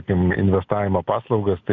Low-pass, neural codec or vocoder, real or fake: 7.2 kHz; none; real